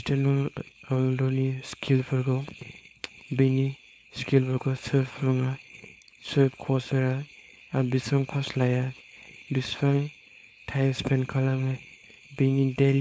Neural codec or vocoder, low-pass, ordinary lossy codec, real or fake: codec, 16 kHz, 4.8 kbps, FACodec; none; none; fake